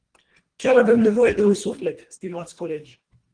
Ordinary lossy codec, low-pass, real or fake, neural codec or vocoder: Opus, 24 kbps; 9.9 kHz; fake; codec, 24 kHz, 1.5 kbps, HILCodec